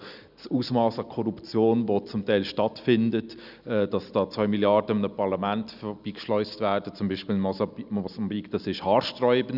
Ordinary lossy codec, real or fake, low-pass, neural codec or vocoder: none; real; 5.4 kHz; none